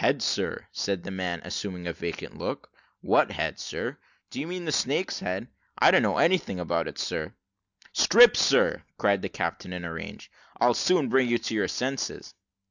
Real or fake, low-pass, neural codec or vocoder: real; 7.2 kHz; none